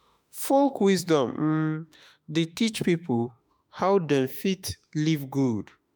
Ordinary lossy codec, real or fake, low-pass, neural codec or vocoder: none; fake; none; autoencoder, 48 kHz, 32 numbers a frame, DAC-VAE, trained on Japanese speech